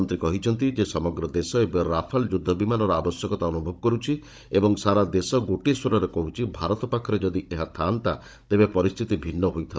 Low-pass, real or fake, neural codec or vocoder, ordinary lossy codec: none; fake; codec, 16 kHz, 16 kbps, FunCodec, trained on Chinese and English, 50 frames a second; none